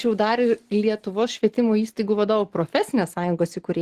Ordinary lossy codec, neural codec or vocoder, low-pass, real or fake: Opus, 16 kbps; none; 14.4 kHz; real